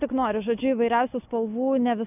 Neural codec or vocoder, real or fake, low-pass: none; real; 3.6 kHz